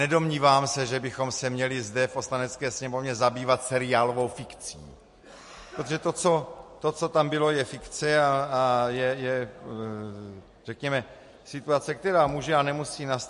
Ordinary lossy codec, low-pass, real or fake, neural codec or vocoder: MP3, 48 kbps; 14.4 kHz; real; none